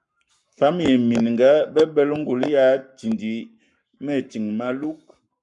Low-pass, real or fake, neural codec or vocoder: 10.8 kHz; fake; codec, 44.1 kHz, 7.8 kbps, Pupu-Codec